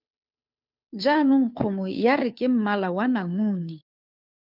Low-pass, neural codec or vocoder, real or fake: 5.4 kHz; codec, 16 kHz, 2 kbps, FunCodec, trained on Chinese and English, 25 frames a second; fake